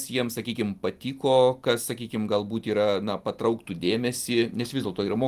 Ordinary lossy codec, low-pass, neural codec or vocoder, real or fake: Opus, 24 kbps; 14.4 kHz; none; real